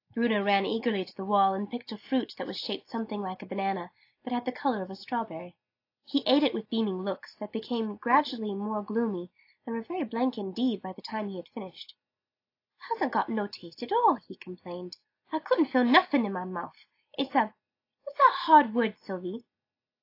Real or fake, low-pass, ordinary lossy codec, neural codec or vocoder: real; 5.4 kHz; AAC, 32 kbps; none